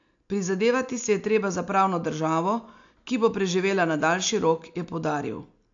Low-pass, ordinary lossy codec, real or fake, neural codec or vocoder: 7.2 kHz; AAC, 64 kbps; real; none